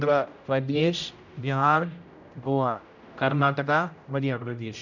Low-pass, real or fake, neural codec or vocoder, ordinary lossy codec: 7.2 kHz; fake; codec, 16 kHz, 0.5 kbps, X-Codec, HuBERT features, trained on general audio; none